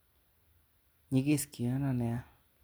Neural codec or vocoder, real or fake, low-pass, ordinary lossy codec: none; real; none; none